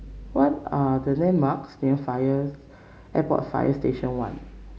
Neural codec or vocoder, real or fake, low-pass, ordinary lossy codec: none; real; none; none